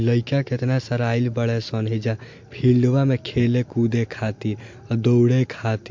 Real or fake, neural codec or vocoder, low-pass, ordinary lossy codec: real; none; 7.2 kHz; MP3, 48 kbps